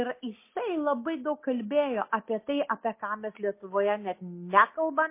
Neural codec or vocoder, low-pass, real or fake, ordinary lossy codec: none; 3.6 kHz; real; MP3, 24 kbps